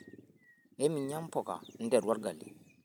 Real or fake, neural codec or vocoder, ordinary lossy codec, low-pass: real; none; none; none